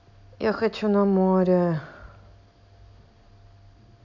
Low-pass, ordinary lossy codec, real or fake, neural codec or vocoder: 7.2 kHz; none; real; none